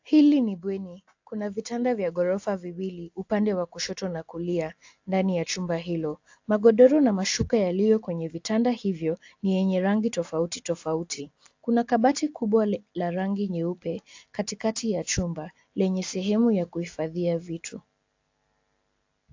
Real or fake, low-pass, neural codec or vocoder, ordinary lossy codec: real; 7.2 kHz; none; AAC, 48 kbps